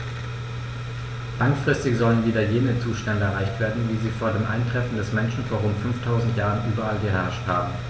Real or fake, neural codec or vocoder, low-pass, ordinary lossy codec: real; none; none; none